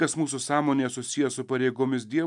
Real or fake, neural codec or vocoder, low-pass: real; none; 10.8 kHz